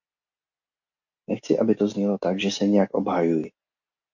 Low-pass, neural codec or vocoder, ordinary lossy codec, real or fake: 7.2 kHz; none; MP3, 48 kbps; real